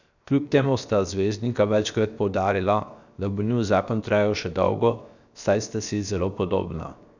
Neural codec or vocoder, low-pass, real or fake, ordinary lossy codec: codec, 16 kHz, 0.7 kbps, FocalCodec; 7.2 kHz; fake; none